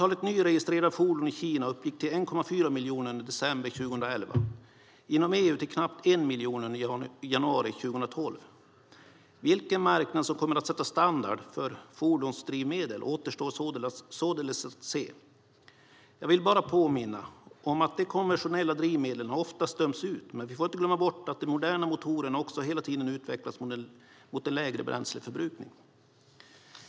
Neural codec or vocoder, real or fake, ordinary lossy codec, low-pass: none; real; none; none